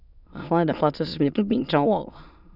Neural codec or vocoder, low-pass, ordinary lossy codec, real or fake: autoencoder, 22.05 kHz, a latent of 192 numbers a frame, VITS, trained on many speakers; 5.4 kHz; none; fake